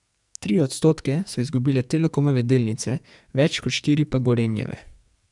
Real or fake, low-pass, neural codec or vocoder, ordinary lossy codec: fake; 10.8 kHz; codec, 44.1 kHz, 2.6 kbps, SNAC; none